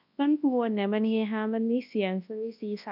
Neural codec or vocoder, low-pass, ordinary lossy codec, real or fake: codec, 24 kHz, 0.9 kbps, WavTokenizer, large speech release; 5.4 kHz; AAC, 48 kbps; fake